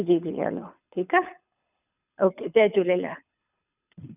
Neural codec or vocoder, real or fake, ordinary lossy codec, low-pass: codec, 24 kHz, 6 kbps, HILCodec; fake; none; 3.6 kHz